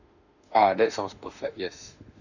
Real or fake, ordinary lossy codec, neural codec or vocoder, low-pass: fake; none; autoencoder, 48 kHz, 32 numbers a frame, DAC-VAE, trained on Japanese speech; 7.2 kHz